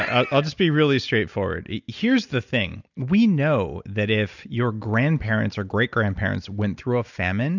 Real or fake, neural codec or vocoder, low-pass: real; none; 7.2 kHz